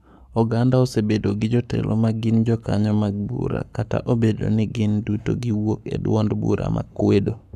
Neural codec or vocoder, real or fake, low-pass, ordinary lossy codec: codec, 44.1 kHz, 7.8 kbps, Pupu-Codec; fake; 14.4 kHz; none